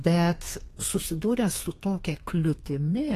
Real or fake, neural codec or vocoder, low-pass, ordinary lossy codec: fake; codec, 32 kHz, 1.9 kbps, SNAC; 14.4 kHz; AAC, 48 kbps